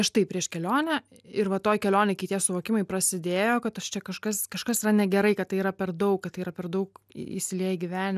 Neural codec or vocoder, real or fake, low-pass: none; real; 14.4 kHz